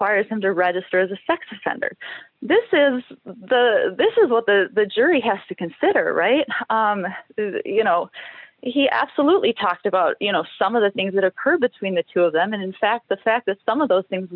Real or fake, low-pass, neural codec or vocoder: real; 5.4 kHz; none